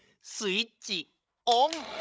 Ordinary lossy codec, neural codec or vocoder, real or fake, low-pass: none; codec, 16 kHz, 16 kbps, FreqCodec, larger model; fake; none